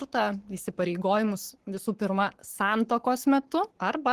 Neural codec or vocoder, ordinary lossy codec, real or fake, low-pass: codec, 44.1 kHz, 7.8 kbps, Pupu-Codec; Opus, 16 kbps; fake; 14.4 kHz